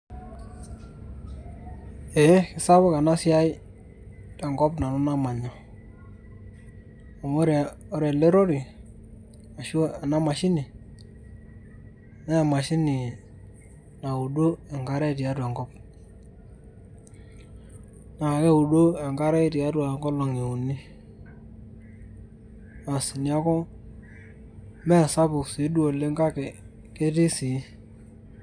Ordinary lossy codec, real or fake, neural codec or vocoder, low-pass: none; real; none; 9.9 kHz